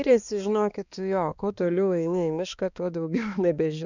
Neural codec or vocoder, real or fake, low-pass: codec, 16 kHz, 2 kbps, X-Codec, HuBERT features, trained on balanced general audio; fake; 7.2 kHz